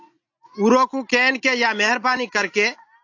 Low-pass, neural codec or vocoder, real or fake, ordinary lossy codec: 7.2 kHz; none; real; AAC, 48 kbps